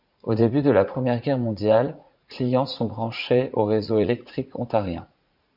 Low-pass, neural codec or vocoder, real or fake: 5.4 kHz; vocoder, 44.1 kHz, 80 mel bands, Vocos; fake